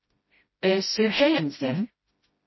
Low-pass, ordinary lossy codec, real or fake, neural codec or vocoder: 7.2 kHz; MP3, 24 kbps; fake; codec, 16 kHz, 0.5 kbps, FreqCodec, smaller model